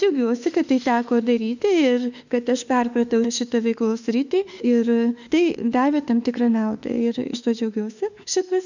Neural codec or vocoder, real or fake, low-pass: autoencoder, 48 kHz, 32 numbers a frame, DAC-VAE, trained on Japanese speech; fake; 7.2 kHz